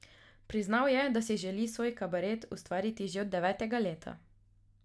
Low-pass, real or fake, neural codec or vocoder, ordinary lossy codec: none; real; none; none